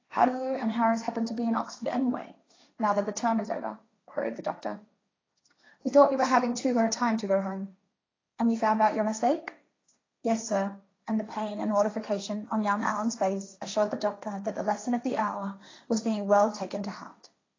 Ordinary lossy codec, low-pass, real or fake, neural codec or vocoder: AAC, 32 kbps; 7.2 kHz; fake; codec, 16 kHz, 1.1 kbps, Voila-Tokenizer